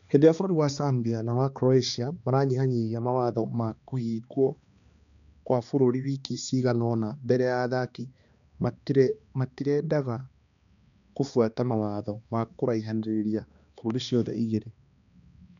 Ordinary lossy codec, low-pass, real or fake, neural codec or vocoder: none; 7.2 kHz; fake; codec, 16 kHz, 2 kbps, X-Codec, HuBERT features, trained on balanced general audio